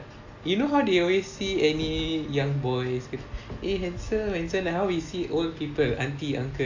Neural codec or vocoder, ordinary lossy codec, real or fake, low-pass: none; AAC, 48 kbps; real; 7.2 kHz